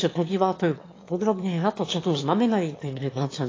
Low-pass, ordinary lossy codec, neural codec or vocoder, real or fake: 7.2 kHz; AAC, 32 kbps; autoencoder, 22.05 kHz, a latent of 192 numbers a frame, VITS, trained on one speaker; fake